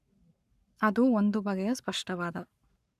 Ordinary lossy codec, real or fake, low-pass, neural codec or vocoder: none; fake; 14.4 kHz; codec, 44.1 kHz, 7.8 kbps, Pupu-Codec